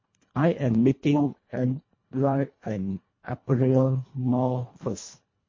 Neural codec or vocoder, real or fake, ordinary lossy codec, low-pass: codec, 24 kHz, 1.5 kbps, HILCodec; fake; MP3, 32 kbps; 7.2 kHz